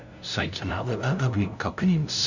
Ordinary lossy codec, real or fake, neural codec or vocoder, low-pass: none; fake; codec, 16 kHz, 0.5 kbps, FunCodec, trained on LibriTTS, 25 frames a second; 7.2 kHz